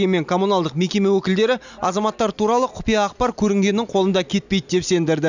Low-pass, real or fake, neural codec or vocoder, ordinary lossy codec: 7.2 kHz; real; none; none